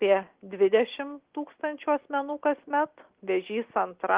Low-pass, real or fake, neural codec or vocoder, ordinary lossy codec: 3.6 kHz; real; none; Opus, 16 kbps